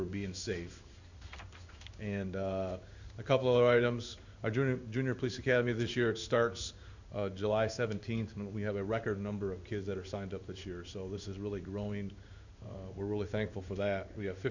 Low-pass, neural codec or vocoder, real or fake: 7.2 kHz; codec, 16 kHz in and 24 kHz out, 1 kbps, XY-Tokenizer; fake